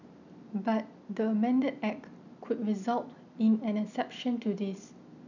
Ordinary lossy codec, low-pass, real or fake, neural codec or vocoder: none; 7.2 kHz; real; none